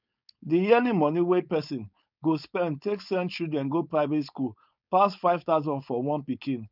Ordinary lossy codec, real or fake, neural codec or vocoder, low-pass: none; fake; codec, 16 kHz, 4.8 kbps, FACodec; 5.4 kHz